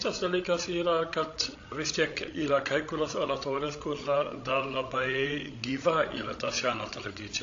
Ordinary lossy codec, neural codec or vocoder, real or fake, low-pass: AAC, 48 kbps; codec, 16 kHz, 16 kbps, FreqCodec, larger model; fake; 7.2 kHz